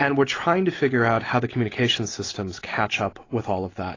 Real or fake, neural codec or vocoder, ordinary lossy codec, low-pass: real; none; AAC, 32 kbps; 7.2 kHz